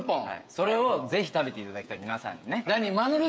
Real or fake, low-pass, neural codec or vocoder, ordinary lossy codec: fake; none; codec, 16 kHz, 16 kbps, FreqCodec, smaller model; none